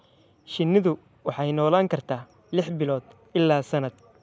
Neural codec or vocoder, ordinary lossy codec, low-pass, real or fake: none; none; none; real